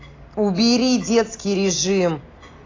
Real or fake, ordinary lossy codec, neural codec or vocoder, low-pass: real; AAC, 32 kbps; none; 7.2 kHz